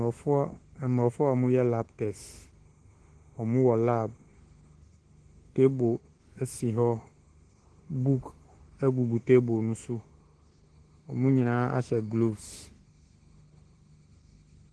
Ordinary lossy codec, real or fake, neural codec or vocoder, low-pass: Opus, 16 kbps; fake; autoencoder, 48 kHz, 32 numbers a frame, DAC-VAE, trained on Japanese speech; 10.8 kHz